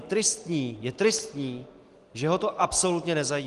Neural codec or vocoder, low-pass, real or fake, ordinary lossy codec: none; 10.8 kHz; real; Opus, 24 kbps